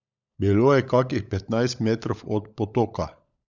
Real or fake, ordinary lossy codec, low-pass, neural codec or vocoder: fake; none; 7.2 kHz; codec, 16 kHz, 16 kbps, FunCodec, trained on LibriTTS, 50 frames a second